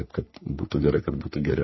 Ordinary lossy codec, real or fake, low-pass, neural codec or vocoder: MP3, 24 kbps; fake; 7.2 kHz; codec, 44.1 kHz, 3.4 kbps, Pupu-Codec